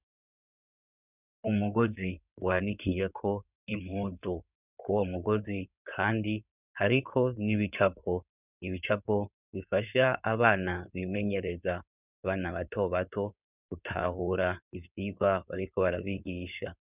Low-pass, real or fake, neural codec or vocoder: 3.6 kHz; fake; codec, 16 kHz in and 24 kHz out, 2.2 kbps, FireRedTTS-2 codec